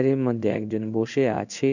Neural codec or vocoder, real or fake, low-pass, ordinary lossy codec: codec, 16 kHz in and 24 kHz out, 1 kbps, XY-Tokenizer; fake; 7.2 kHz; none